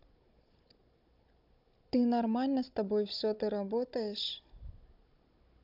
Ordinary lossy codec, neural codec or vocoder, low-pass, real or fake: MP3, 48 kbps; codec, 16 kHz, 16 kbps, FunCodec, trained on Chinese and English, 50 frames a second; 5.4 kHz; fake